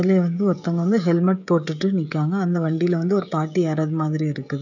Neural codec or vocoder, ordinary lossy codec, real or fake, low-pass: codec, 16 kHz, 16 kbps, FreqCodec, smaller model; none; fake; 7.2 kHz